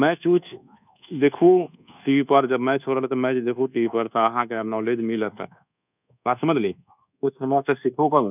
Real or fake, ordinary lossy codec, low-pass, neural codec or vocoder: fake; none; 3.6 kHz; codec, 24 kHz, 1.2 kbps, DualCodec